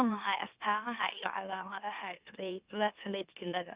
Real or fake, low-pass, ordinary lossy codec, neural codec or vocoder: fake; 3.6 kHz; Opus, 64 kbps; autoencoder, 44.1 kHz, a latent of 192 numbers a frame, MeloTTS